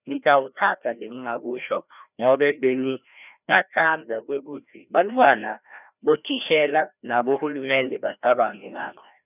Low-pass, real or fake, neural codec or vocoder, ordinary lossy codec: 3.6 kHz; fake; codec, 16 kHz, 1 kbps, FreqCodec, larger model; none